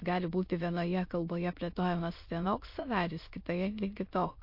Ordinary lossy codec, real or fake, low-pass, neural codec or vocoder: MP3, 32 kbps; fake; 5.4 kHz; autoencoder, 22.05 kHz, a latent of 192 numbers a frame, VITS, trained on many speakers